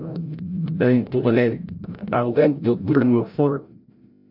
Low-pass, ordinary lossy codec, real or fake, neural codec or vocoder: 5.4 kHz; MP3, 48 kbps; fake; codec, 16 kHz, 0.5 kbps, FreqCodec, larger model